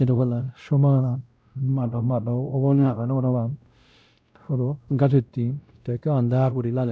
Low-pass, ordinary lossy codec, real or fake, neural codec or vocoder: none; none; fake; codec, 16 kHz, 0.5 kbps, X-Codec, WavLM features, trained on Multilingual LibriSpeech